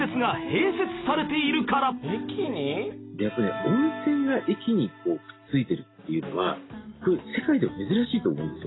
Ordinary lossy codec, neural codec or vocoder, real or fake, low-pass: AAC, 16 kbps; none; real; 7.2 kHz